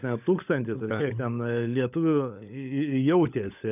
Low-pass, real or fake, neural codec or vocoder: 3.6 kHz; fake; codec, 16 kHz, 16 kbps, FunCodec, trained on LibriTTS, 50 frames a second